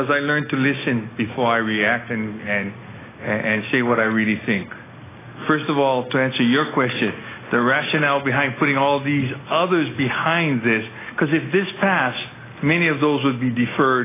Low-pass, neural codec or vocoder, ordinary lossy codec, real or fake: 3.6 kHz; none; AAC, 16 kbps; real